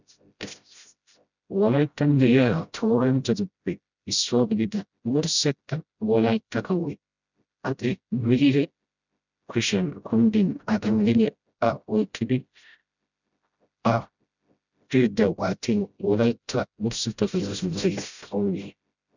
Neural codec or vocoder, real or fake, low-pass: codec, 16 kHz, 0.5 kbps, FreqCodec, smaller model; fake; 7.2 kHz